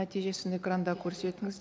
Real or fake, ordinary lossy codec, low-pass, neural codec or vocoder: real; none; none; none